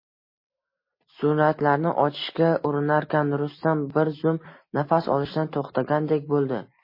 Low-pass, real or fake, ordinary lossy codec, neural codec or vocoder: 5.4 kHz; real; MP3, 24 kbps; none